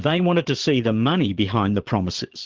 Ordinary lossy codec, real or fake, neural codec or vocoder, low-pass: Opus, 16 kbps; fake; vocoder, 22.05 kHz, 80 mel bands, Vocos; 7.2 kHz